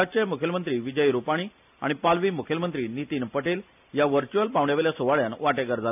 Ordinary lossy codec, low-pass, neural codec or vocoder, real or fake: none; 3.6 kHz; none; real